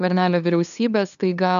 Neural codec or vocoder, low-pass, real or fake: codec, 16 kHz, 2 kbps, FunCodec, trained on LibriTTS, 25 frames a second; 7.2 kHz; fake